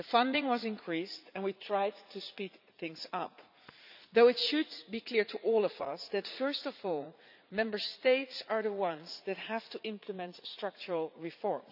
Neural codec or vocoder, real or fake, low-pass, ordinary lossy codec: vocoder, 44.1 kHz, 80 mel bands, Vocos; fake; 5.4 kHz; none